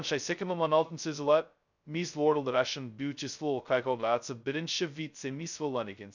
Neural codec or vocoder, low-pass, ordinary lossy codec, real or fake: codec, 16 kHz, 0.2 kbps, FocalCodec; 7.2 kHz; none; fake